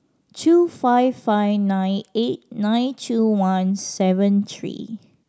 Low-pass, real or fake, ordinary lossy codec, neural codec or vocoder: none; real; none; none